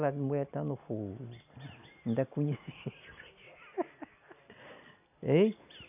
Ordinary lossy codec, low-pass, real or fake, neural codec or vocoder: none; 3.6 kHz; real; none